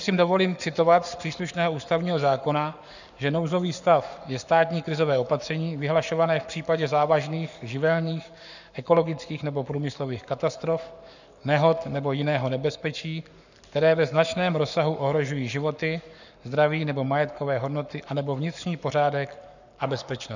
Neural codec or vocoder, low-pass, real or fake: codec, 44.1 kHz, 7.8 kbps, Pupu-Codec; 7.2 kHz; fake